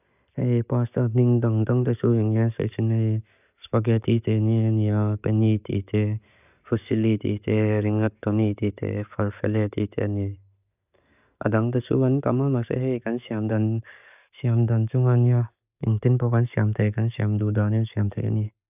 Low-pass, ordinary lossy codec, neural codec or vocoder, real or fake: 3.6 kHz; none; none; real